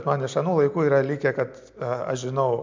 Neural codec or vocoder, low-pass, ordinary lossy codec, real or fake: none; 7.2 kHz; MP3, 64 kbps; real